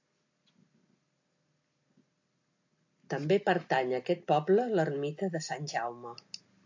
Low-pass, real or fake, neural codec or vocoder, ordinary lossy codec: 7.2 kHz; real; none; AAC, 48 kbps